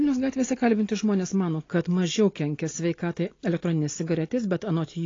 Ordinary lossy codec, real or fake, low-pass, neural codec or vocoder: AAC, 32 kbps; real; 7.2 kHz; none